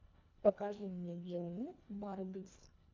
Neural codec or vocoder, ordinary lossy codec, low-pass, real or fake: codec, 24 kHz, 1.5 kbps, HILCodec; MP3, 64 kbps; 7.2 kHz; fake